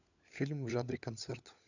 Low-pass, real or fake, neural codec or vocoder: 7.2 kHz; fake; codec, 16 kHz, 16 kbps, FunCodec, trained on LibriTTS, 50 frames a second